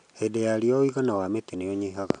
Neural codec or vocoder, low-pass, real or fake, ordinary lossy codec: none; 9.9 kHz; real; none